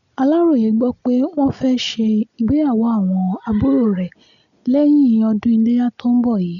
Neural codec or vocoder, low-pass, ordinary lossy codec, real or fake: none; 7.2 kHz; MP3, 96 kbps; real